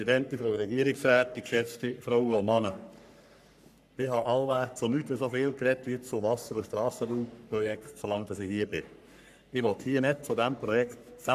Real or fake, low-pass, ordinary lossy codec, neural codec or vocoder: fake; 14.4 kHz; none; codec, 44.1 kHz, 3.4 kbps, Pupu-Codec